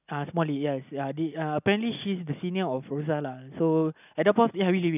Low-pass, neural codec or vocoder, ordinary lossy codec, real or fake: 3.6 kHz; none; none; real